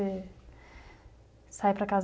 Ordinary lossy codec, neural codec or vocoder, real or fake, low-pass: none; none; real; none